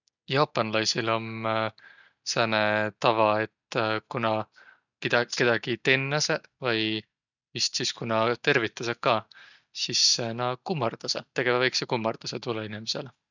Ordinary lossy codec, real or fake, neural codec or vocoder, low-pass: none; real; none; 7.2 kHz